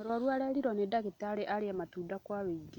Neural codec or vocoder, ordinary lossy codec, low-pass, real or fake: none; none; 19.8 kHz; real